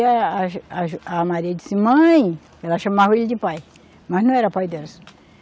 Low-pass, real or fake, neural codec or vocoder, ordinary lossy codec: none; real; none; none